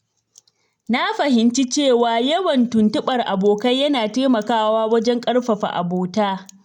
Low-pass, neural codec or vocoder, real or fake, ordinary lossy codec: 19.8 kHz; none; real; none